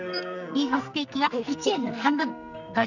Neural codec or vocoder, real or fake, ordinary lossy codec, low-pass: codec, 44.1 kHz, 2.6 kbps, SNAC; fake; none; 7.2 kHz